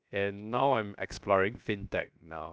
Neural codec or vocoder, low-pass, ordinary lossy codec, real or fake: codec, 16 kHz, 0.7 kbps, FocalCodec; none; none; fake